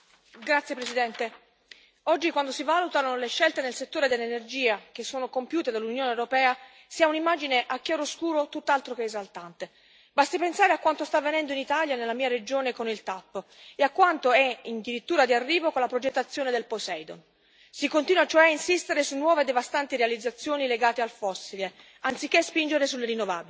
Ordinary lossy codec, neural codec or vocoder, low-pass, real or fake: none; none; none; real